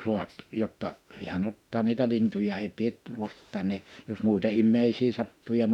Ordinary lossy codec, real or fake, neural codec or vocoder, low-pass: none; fake; autoencoder, 48 kHz, 32 numbers a frame, DAC-VAE, trained on Japanese speech; 19.8 kHz